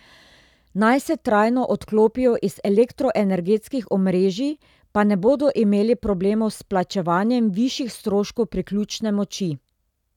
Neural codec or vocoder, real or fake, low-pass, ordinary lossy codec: none; real; 19.8 kHz; none